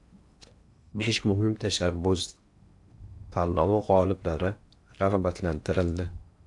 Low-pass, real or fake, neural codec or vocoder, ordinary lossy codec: 10.8 kHz; fake; codec, 16 kHz in and 24 kHz out, 0.8 kbps, FocalCodec, streaming, 65536 codes; AAC, 64 kbps